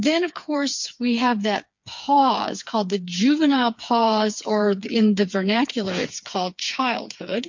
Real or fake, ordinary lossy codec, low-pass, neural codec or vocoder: fake; MP3, 48 kbps; 7.2 kHz; codec, 16 kHz, 4 kbps, FreqCodec, smaller model